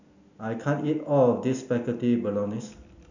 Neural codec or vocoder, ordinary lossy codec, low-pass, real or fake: none; none; 7.2 kHz; real